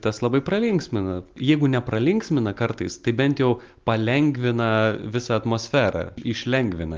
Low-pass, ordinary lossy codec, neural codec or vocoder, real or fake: 7.2 kHz; Opus, 32 kbps; none; real